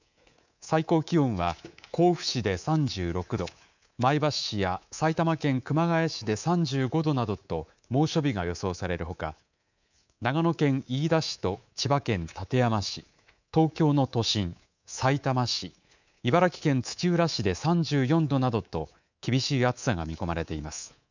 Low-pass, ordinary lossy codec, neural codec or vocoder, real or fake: 7.2 kHz; none; codec, 24 kHz, 3.1 kbps, DualCodec; fake